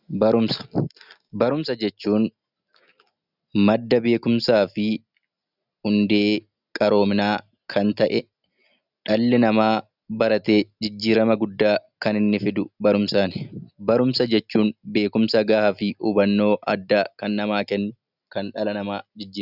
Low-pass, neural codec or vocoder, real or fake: 5.4 kHz; none; real